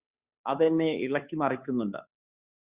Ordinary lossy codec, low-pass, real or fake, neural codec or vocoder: Opus, 64 kbps; 3.6 kHz; fake; codec, 16 kHz, 2 kbps, FunCodec, trained on Chinese and English, 25 frames a second